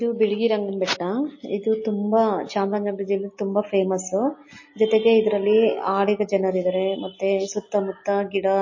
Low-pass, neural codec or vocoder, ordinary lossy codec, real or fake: 7.2 kHz; none; MP3, 32 kbps; real